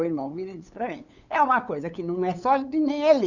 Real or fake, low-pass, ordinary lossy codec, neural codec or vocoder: fake; 7.2 kHz; none; codec, 16 kHz, 8 kbps, FunCodec, trained on LibriTTS, 25 frames a second